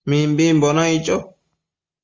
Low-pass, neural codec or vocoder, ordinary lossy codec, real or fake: 7.2 kHz; none; Opus, 32 kbps; real